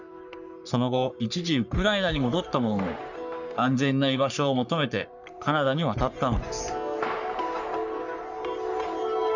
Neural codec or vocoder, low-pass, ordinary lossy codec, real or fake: codec, 44.1 kHz, 3.4 kbps, Pupu-Codec; 7.2 kHz; none; fake